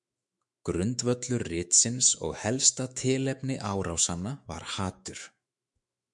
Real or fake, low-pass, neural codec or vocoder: fake; 10.8 kHz; autoencoder, 48 kHz, 128 numbers a frame, DAC-VAE, trained on Japanese speech